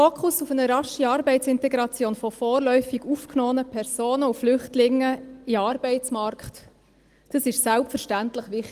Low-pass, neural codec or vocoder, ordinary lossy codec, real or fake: 14.4 kHz; none; Opus, 24 kbps; real